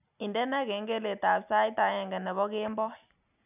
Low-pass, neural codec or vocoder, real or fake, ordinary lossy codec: 3.6 kHz; none; real; none